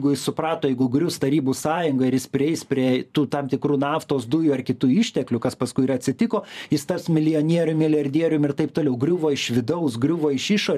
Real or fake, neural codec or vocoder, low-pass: fake; vocoder, 44.1 kHz, 128 mel bands every 512 samples, BigVGAN v2; 14.4 kHz